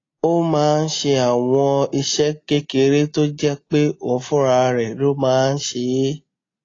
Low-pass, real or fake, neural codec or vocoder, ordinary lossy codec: 7.2 kHz; real; none; AAC, 32 kbps